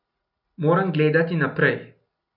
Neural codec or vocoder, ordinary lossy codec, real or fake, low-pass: none; none; real; 5.4 kHz